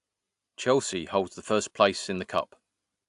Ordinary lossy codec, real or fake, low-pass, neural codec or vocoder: AAC, 64 kbps; real; 10.8 kHz; none